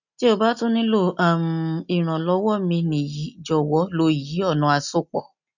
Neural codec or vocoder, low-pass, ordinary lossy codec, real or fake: none; 7.2 kHz; none; real